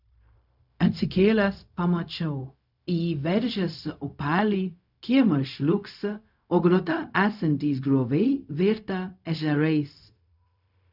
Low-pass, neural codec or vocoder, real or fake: 5.4 kHz; codec, 16 kHz, 0.4 kbps, LongCat-Audio-Codec; fake